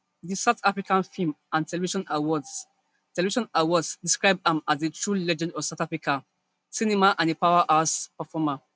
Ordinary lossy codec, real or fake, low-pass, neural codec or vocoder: none; real; none; none